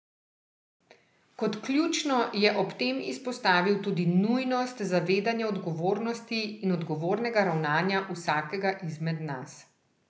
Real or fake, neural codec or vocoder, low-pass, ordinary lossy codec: real; none; none; none